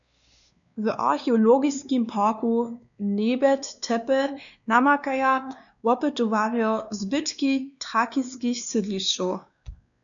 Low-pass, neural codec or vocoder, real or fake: 7.2 kHz; codec, 16 kHz, 2 kbps, X-Codec, WavLM features, trained on Multilingual LibriSpeech; fake